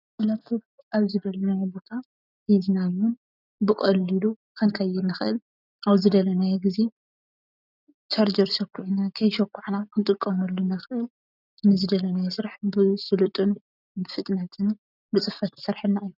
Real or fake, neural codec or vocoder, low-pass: real; none; 5.4 kHz